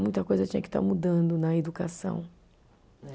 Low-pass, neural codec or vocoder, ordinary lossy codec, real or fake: none; none; none; real